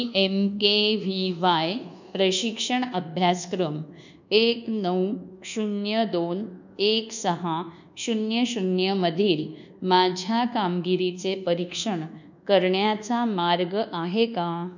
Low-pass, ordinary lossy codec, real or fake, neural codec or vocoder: 7.2 kHz; none; fake; codec, 24 kHz, 1.2 kbps, DualCodec